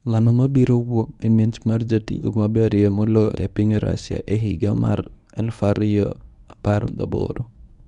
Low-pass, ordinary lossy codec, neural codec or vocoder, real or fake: 10.8 kHz; none; codec, 24 kHz, 0.9 kbps, WavTokenizer, medium speech release version 1; fake